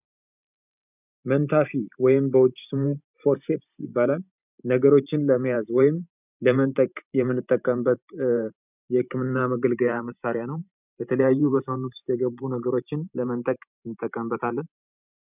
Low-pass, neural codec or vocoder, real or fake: 3.6 kHz; vocoder, 44.1 kHz, 128 mel bands every 512 samples, BigVGAN v2; fake